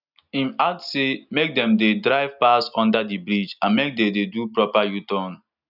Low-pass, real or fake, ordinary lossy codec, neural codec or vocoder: 5.4 kHz; real; none; none